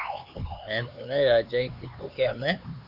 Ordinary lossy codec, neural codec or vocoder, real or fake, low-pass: Opus, 64 kbps; codec, 16 kHz, 4 kbps, X-Codec, HuBERT features, trained on LibriSpeech; fake; 5.4 kHz